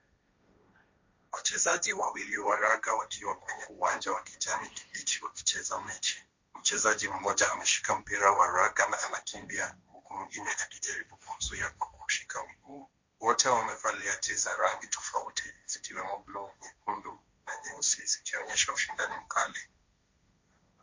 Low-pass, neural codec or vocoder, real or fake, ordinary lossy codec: 7.2 kHz; codec, 16 kHz, 1.1 kbps, Voila-Tokenizer; fake; MP3, 48 kbps